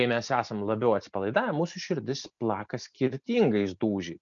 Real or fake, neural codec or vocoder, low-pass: real; none; 7.2 kHz